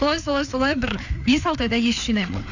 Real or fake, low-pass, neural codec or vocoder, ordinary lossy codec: fake; 7.2 kHz; codec, 16 kHz, 4 kbps, FreqCodec, larger model; none